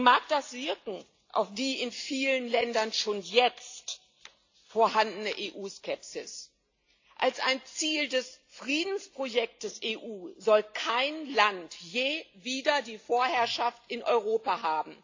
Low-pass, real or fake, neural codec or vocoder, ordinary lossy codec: 7.2 kHz; real; none; AAC, 48 kbps